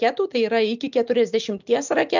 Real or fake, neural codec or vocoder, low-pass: real; none; 7.2 kHz